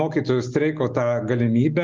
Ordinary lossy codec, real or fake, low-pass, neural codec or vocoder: Opus, 32 kbps; real; 7.2 kHz; none